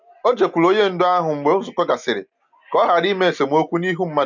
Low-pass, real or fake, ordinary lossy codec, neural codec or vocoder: 7.2 kHz; real; none; none